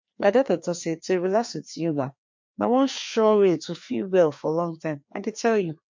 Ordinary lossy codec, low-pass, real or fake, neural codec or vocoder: MP3, 48 kbps; 7.2 kHz; fake; codec, 16 kHz, 2 kbps, FreqCodec, larger model